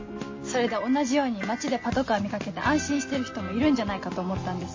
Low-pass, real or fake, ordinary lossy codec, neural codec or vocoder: 7.2 kHz; real; MP3, 32 kbps; none